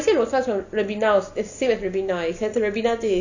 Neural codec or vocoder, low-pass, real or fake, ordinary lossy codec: none; 7.2 kHz; real; none